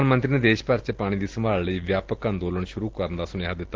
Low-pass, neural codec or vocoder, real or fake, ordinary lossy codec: 7.2 kHz; none; real; Opus, 16 kbps